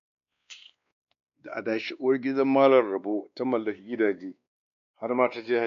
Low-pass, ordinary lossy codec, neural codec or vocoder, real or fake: 7.2 kHz; none; codec, 16 kHz, 2 kbps, X-Codec, WavLM features, trained on Multilingual LibriSpeech; fake